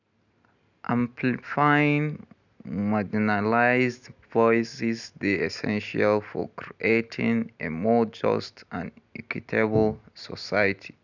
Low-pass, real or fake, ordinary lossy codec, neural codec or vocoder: 7.2 kHz; real; none; none